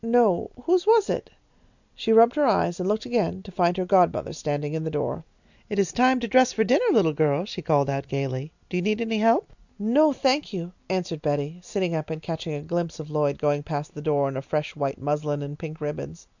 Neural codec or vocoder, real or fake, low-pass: none; real; 7.2 kHz